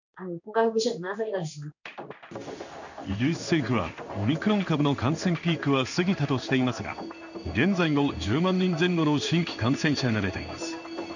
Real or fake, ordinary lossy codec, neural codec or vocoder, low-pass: fake; none; codec, 16 kHz in and 24 kHz out, 1 kbps, XY-Tokenizer; 7.2 kHz